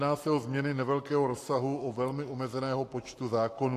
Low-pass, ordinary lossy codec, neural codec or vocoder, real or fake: 14.4 kHz; AAC, 64 kbps; codec, 44.1 kHz, 7.8 kbps, Pupu-Codec; fake